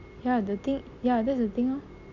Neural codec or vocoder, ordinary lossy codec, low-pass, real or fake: none; none; 7.2 kHz; real